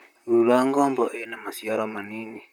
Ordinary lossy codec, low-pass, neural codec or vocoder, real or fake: none; 19.8 kHz; vocoder, 44.1 kHz, 128 mel bands, Pupu-Vocoder; fake